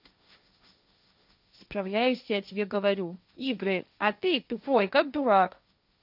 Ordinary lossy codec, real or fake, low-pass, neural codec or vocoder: none; fake; 5.4 kHz; codec, 16 kHz, 1.1 kbps, Voila-Tokenizer